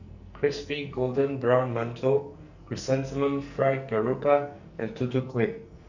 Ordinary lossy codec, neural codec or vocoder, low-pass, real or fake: none; codec, 44.1 kHz, 2.6 kbps, SNAC; 7.2 kHz; fake